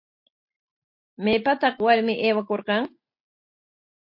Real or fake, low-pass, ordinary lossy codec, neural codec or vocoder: real; 5.4 kHz; MP3, 32 kbps; none